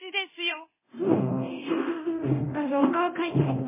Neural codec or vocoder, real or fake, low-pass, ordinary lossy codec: codec, 24 kHz, 0.9 kbps, DualCodec; fake; 3.6 kHz; MP3, 16 kbps